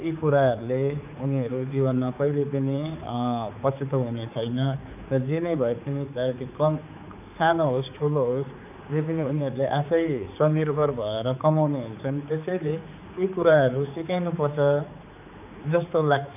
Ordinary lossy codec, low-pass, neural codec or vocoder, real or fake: none; 3.6 kHz; codec, 16 kHz, 4 kbps, X-Codec, HuBERT features, trained on general audio; fake